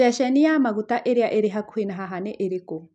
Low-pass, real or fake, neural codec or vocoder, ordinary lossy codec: 10.8 kHz; real; none; none